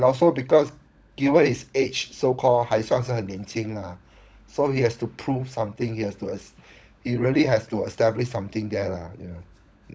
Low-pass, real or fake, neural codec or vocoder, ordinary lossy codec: none; fake; codec, 16 kHz, 16 kbps, FunCodec, trained on LibriTTS, 50 frames a second; none